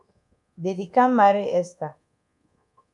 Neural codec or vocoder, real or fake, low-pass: codec, 24 kHz, 1.2 kbps, DualCodec; fake; 10.8 kHz